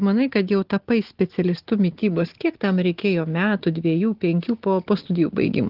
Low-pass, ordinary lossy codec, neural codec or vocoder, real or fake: 5.4 kHz; Opus, 16 kbps; none; real